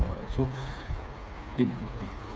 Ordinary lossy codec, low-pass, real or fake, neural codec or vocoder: none; none; fake; codec, 16 kHz, 4 kbps, FreqCodec, smaller model